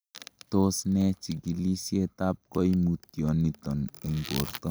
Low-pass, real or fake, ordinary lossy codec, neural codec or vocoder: none; real; none; none